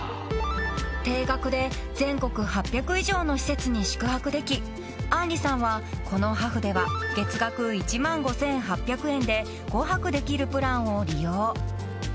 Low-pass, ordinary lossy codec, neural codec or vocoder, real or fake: none; none; none; real